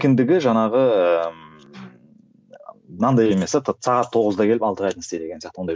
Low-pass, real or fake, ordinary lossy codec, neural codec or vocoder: none; real; none; none